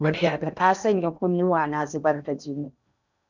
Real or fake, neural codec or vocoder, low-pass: fake; codec, 16 kHz in and 24 kHz out, 0.8 kbps, FocalCodec, streaming, 65536 codes; 7.2 kHz